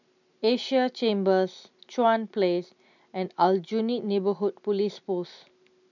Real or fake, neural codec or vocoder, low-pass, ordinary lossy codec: real; none; 7.2 kHz; none